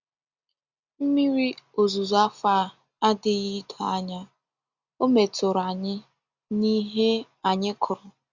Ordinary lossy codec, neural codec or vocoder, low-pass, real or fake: Opus, 64 kbps; none; 7.2 kHz; real